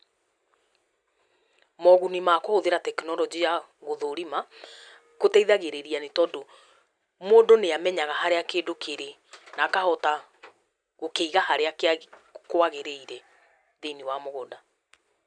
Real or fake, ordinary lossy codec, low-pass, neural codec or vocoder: real; none; 9.9 kHz; none